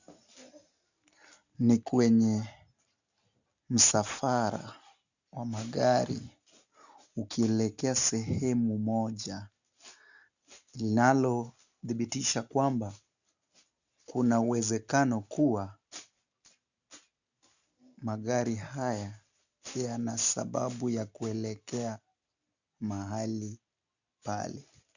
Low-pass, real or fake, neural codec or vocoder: 7.2 kHz; real; none